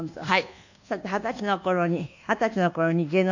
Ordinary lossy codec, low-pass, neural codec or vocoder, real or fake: none; 7.2 kHz; codec, 24 kHz, 1.2 kbps, DualCodec; fake